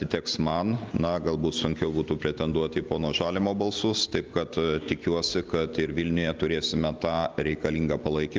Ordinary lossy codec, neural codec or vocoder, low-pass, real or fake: Opus, 24 kbps; none; 7.2 kHz; real